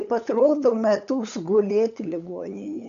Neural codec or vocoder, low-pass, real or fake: codec, 16 kHz, 8 kbps, FunCodec, trained on LibriTTS, 25 frames a second; 7.2 kHz; fake